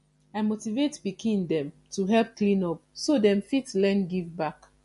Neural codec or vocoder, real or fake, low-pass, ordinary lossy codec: none; real; 14.4 kHz; MP3, 48 kbps